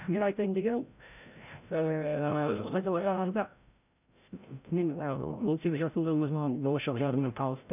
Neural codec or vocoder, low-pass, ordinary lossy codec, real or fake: codec, 16 kHz, 0.5 kbps, FreqCodec, larger model; 3.6 kHz; none; fake